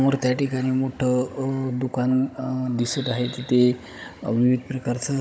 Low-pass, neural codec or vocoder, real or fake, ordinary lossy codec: none; codec, 16 kHz, 16 kbps, FunCodec, trained on Chinese and English, 50 frames a second; fake; none